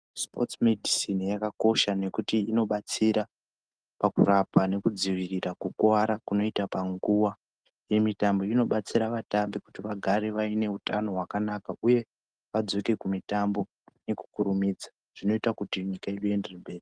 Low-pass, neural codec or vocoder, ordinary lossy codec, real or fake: 9.9 kHz; none; Opus, 24 kbps; real